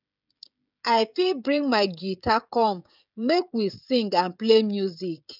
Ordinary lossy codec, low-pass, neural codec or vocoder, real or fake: none; 5.4 kHz; codec, 16 kHz, 16 kbps, FreqCodec, smaller model; fake